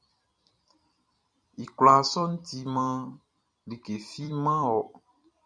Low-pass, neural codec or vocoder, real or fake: 9.9 kHz; vocoder, 44.1 kHz, 128 mel bands every 256 samples, BigVGAN v2; fake